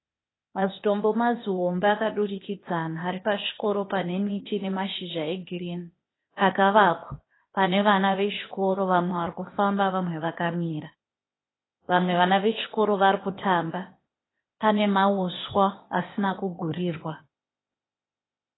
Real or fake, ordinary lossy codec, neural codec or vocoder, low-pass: fake; AAC, 16 kbps; codec, 16 kHz, 0.8 kbps, ZipCodec; 7.2 kHz